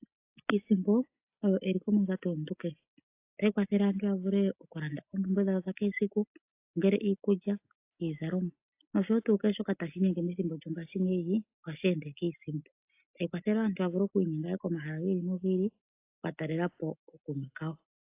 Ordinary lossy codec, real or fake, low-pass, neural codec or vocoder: AAC, 32 kbps; real; 3.6 kHz; none